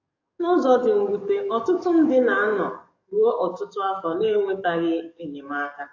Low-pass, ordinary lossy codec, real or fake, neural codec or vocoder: 7.2 kHz; AAC, 48 kbps; fake; codec, 44.1 kHz, 7.8 kbps, DAC